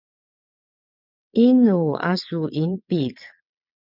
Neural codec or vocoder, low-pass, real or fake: vocoder, 22.05 kHz, 80 mel bands, WaveNeXt; 5.4 kHz; fake